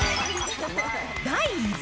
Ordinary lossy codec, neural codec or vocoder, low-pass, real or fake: none; none; none; real